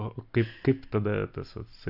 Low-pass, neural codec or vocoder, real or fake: 5.4 kHz; none; real